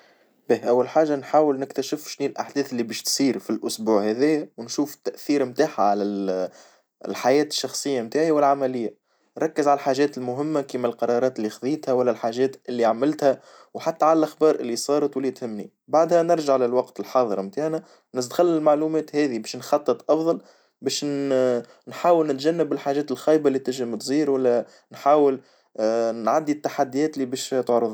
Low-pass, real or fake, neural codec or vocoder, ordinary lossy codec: none; real; none; none